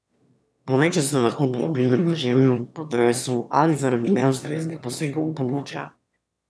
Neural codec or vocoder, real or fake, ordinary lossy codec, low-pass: autoencoder, 22.05 kHz, a latent of 192 numbers a frame, VITS, trained on one speaker; fake; none; none